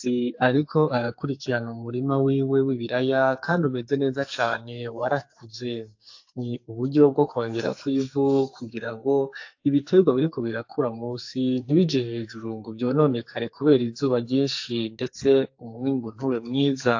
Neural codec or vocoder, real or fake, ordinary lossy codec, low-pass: codec, 44.1 kHz, 2.6 kbps, SNAC; fake; AAC, 48 kbps; 7.2 kHz